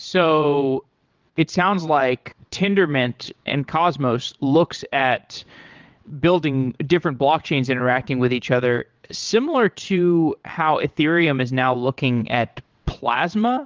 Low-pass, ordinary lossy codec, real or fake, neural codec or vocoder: 7.2 kHz; Opus, 32 kbps; fake; vocoder, 22.05 kHz, 80 mel bands, WaveNeXt